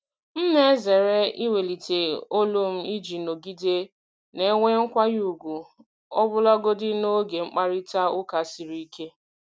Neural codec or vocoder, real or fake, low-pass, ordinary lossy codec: none; real; none; none